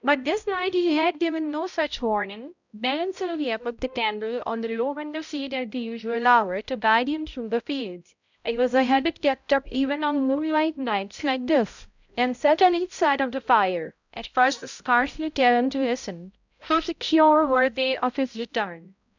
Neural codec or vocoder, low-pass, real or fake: codec, 16 kHz, 0.5 kbps, X-Codec, HuBERT features, trained on balanced general audio; 7.2 kHz; fake